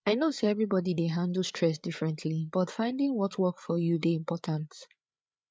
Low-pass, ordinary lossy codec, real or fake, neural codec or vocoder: none; none; fake; codec, 16 kHz, 8 kbps, FreqCodec, larger model